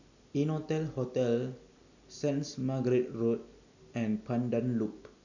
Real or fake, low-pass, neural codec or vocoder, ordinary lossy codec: real; 7.2 kHz; none; none